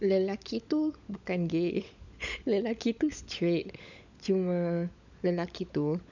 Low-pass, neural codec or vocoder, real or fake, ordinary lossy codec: 7.2 kHz; codec, 16 kHz, 16 kbps, FunCodec, trained on LibriTTS, 50 frames a second; fake; none